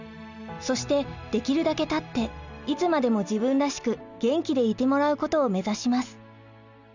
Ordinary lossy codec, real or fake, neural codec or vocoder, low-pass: none; real; none; 7.2 kHz